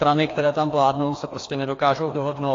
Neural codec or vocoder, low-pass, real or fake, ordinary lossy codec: codec, 16 kHz, 2 kbps, FreqCodec, larger model; 7.2 kHz; fake; AAC, 32 kbps